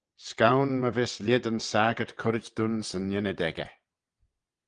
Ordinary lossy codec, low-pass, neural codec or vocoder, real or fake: Opus, 24 kbps; 9.9 kHz; vocoder, 22.05 kHz, 80 mel bands, WaveNeXt; fake